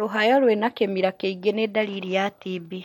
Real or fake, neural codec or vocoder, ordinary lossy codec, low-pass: real; none; MP3, 64 kbps; 19.8 kHz